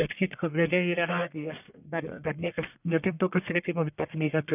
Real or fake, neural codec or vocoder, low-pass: fake; codec, 44.1 kHz, 1.7 kbps, Pupu-Codec; 3.6 kHz